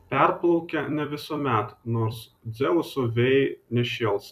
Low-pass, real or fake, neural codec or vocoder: 14.4 kHz; fake; vocoder, 44.1 kHz, 128 mel bands every 256 samples, BigVGAN v2